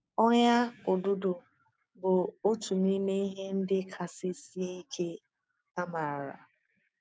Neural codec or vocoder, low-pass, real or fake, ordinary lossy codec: codec, 16 kHz, 6 kbps, DAC; none; fake; none